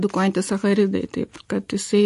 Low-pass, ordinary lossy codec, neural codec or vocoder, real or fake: 14.4 kHz; MP3, 48 kbps; none; real